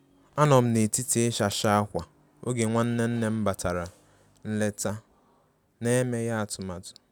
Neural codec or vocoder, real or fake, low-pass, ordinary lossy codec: none; real; none; none